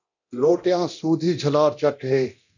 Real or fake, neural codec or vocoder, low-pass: fake; codec, 24 kHz, 0.9 kbps, DualCodec; 7.2 kHz